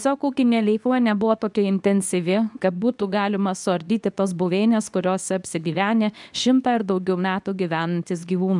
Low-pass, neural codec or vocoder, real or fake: 10.8 kHz; codec, 24 kHz, 0.9 kbps, WavTokenizer, medium speech release version 2; fake